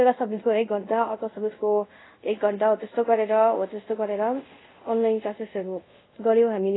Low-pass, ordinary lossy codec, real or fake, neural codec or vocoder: 7.2 kHz; AAC, 16 kbps; fake; codec, 24 kHz, 0.5 kbps, DualCodec